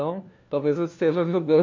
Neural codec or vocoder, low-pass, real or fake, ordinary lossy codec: codec, 16 kHz, 1 kbps, FunCodec, trained on LibriTTS, 50 frames a second; 7.2 kHz; fake; MP3, 48 kbps